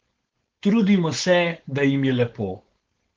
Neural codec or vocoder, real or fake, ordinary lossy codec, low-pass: codec, 16 kHz, 4.8 kbps, FACodec; fake; Opus, 16 kbps; 7.2 kHz